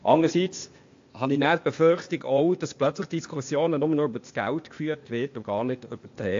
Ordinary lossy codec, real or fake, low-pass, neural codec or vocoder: AAC, 48 kbps; fake; 7.2 kHz; codec, 16 kHz, 0.8 kbps, ZipCodec